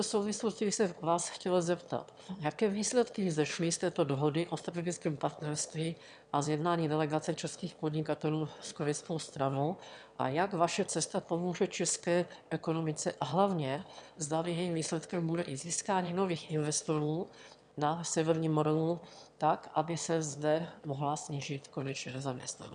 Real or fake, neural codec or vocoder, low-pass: fake; autoencoder, 22.05 kHz, a latent of 192 numbers a frame, VITS, trained on one speaker; 9.9 kHz